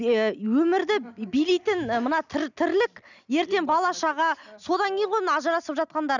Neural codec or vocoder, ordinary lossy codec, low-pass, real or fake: none; none; 7.2 kHz; real